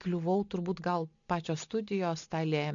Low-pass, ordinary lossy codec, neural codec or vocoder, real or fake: 7.2 kHz; MP3, 96 kbps; none; real